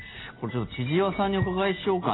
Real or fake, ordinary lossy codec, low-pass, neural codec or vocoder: real; AAC, 16 kbps; 7.2 kHz; none